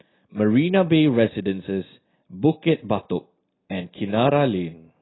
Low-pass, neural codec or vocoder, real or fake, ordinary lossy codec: 7.2 kHz; none; real; AAC, 16 kbps